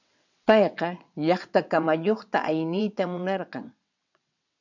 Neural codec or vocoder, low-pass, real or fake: vocoder, 22.05 kHz, 80 mel bands, WaveNeXt; 7.2 kHz; fake